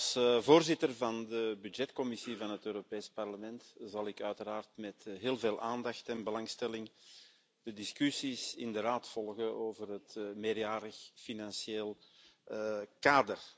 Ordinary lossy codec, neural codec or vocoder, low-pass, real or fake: none; none; none; real